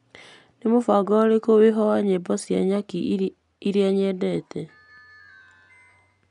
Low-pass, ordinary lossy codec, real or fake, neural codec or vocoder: 10.8 kHz; none; real; none